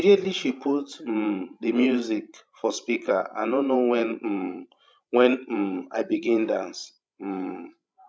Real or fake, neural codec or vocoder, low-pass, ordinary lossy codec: fake; codec, 16 kHz, 16 kbps, FreqCodec, larger model; none; none